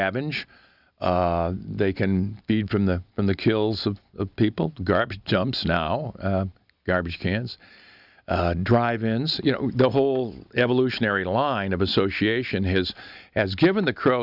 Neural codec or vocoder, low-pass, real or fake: none; 5.4 kHz; real